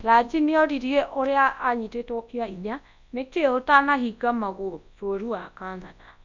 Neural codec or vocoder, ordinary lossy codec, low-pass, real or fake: codec, 24 kHz, 0.9 kbps, WavTokenizer, large speech release; Opus, 64 kbps; 7.2 kHz; fake